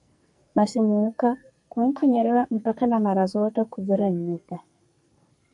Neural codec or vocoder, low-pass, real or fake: codec, 44.1 kHz, 2.6 kbps, SNAC; 10.8 kHz; fake